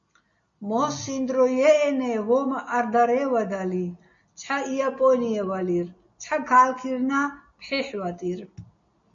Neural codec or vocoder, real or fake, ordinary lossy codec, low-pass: none; real; MP3, 48 kbps; 7.2 kHz